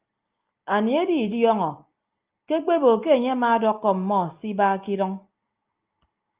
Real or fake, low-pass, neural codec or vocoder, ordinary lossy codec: real; 3.6 kHz; none; Opus, 24 kbps